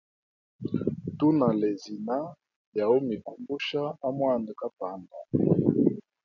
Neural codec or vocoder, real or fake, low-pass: none; real; 7.2 kHz